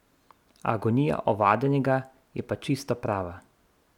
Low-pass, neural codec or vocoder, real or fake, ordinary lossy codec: 19.8 kHz; none; real; none